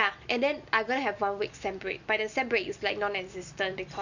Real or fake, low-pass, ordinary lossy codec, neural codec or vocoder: real; 7.2 kHz; none; none